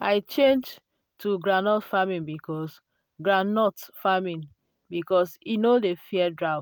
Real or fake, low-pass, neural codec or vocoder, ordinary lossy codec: real; none; none; none